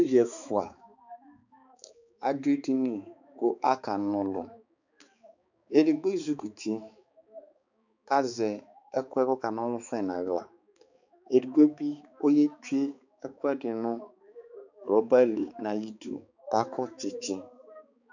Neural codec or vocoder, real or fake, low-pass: codec, 16 kHz, 4 kbps, X-Codec, HuBERT features, trained on balanced general audio; fake; 7.2 kHz